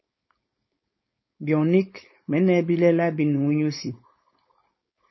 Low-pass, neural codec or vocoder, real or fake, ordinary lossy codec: 7.2 kHz; codec, 16 kHz, 4.8 kbps, FACodec; fake; MP3, 24 kbps